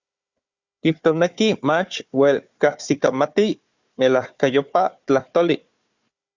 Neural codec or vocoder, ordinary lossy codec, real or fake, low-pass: codec, 16 kHz, 4 kbps, FunCodec, trained on Chinese and English, 50 frames a second; Opus, 64 kbps; fake; 7.2 kHz